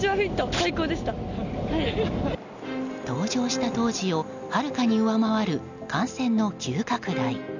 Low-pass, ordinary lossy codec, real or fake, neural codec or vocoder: 7.2 kHz; none; real; none